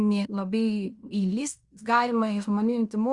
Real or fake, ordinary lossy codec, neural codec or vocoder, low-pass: fake; Opus, 64 kbps; codec, 16 kHz in and 24 kHz out, 0.9 kbps, LongCat-Audio-Codec, fine tuned four codebook decoder; 10.8 kHz